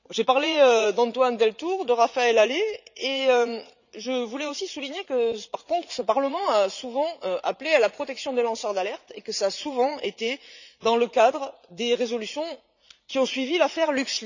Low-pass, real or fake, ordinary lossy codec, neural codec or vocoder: 7.2 kHz; fake; none; vocoder, 44.1 kHz, 80 mel bands, Vocos